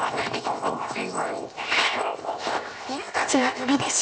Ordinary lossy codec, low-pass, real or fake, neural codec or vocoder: none; none; fake; codec, 16 kHz, 0.7 kbps, FocalCodec